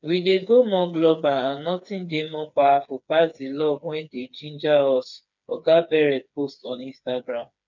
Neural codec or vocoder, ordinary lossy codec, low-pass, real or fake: codec, 16 kHz, 4 kbps, FreqCodec, smaller model; none; 7.2 kHz; fake